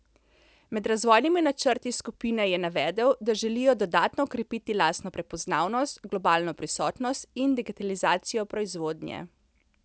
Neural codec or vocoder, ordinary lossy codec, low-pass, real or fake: none; none; none; real